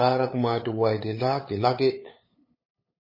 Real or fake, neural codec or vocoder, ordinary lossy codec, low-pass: fake; codec, 16 kHz, 8 kbps, FunCodec, trained on LibriTTS, 25 frames a second; MP3, 24 kbps; 5.4 kHz